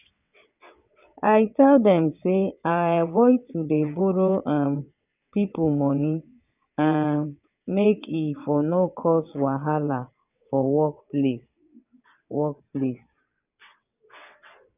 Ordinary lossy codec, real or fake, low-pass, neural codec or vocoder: none; fake; 3.6 kHz; vocoder, 22.05 kHz, 80 mel bands, WaveNeXt